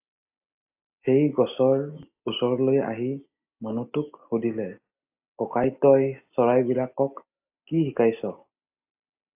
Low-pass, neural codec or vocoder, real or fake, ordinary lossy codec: 3.6 kHz; none; real; AAC, 32 kbps